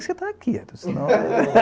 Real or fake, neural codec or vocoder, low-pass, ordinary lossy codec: real; none; none; none